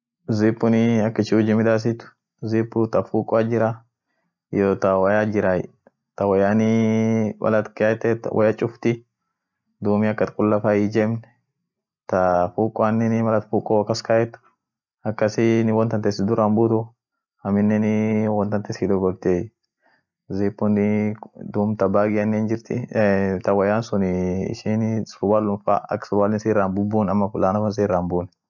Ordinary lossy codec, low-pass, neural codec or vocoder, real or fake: none; 7.2 kHz; none; real